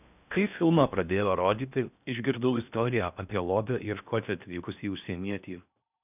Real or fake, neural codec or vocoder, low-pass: fake; codec, 16 kHz in and 24 kHz out, 0.6 kbps, FocalCodec, streaming, 4096 codes; 3.6 kHz